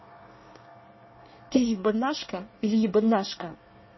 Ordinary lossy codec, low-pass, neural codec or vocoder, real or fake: MP3, 24 kbps; 7.2 kHz; codec, 24 kHz, 1 kbps, SNAC; fake